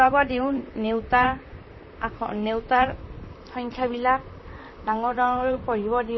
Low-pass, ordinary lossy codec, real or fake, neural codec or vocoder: 7.2 kHz; MP3, 24 kbps; fake; vocoder, 44.1 kHz, 128 mel bands, Pupu-Vocoder